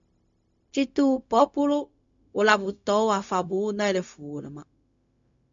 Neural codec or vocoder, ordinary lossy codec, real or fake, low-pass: codec, 16 kHz, 0.4 kbps, LongCat-Audio-Codec; MP3, 64 kbps; fake; 7.2 kHz